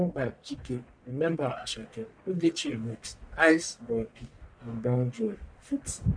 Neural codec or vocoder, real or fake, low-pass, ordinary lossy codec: codec, 44.1 kHz, 1.7 kbps, Pupu-Codec; fake; 9.9 kHz; MP3, 96 kbps